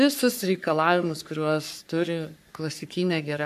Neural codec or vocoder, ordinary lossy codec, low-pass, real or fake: codec, 44.1 kHz, 3.4 kbps, Pupu-Codec; MP3, 96 kbps; 14.4 kHz; fake